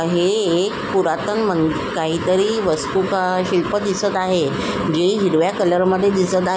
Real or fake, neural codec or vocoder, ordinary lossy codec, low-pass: real; none; none; none